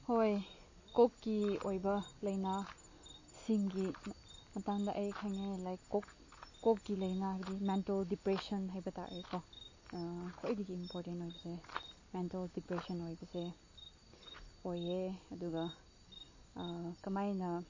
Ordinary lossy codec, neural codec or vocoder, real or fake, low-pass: MP3, 32 kbps; none; real; 7.2 kHz